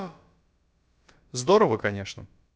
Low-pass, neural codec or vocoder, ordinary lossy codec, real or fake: none; codec, 16 kHz, about 1 kbps, DyCAST, with the encoder's durations; none; fake